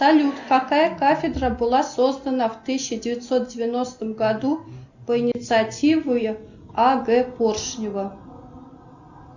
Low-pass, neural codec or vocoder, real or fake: 7.2 kHz; none; real